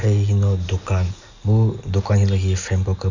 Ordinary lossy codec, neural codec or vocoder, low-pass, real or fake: none; none; 7.2 kHz; real